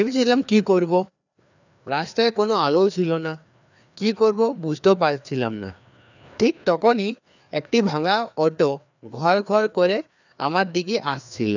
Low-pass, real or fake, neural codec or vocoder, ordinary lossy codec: 7.2 kHz; fake; codec, 16 kHz, 2 kbps, FreqCodec, larger model; none